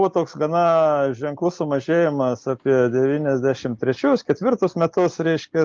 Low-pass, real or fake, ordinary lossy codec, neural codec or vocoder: 7.2 kHz; real; Opus, 24 kbps; none